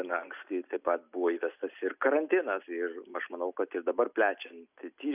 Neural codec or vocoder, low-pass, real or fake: none; 3.6 kHz; real